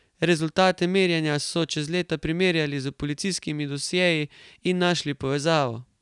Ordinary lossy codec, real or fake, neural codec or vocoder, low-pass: none; real; none; 10.8 kHz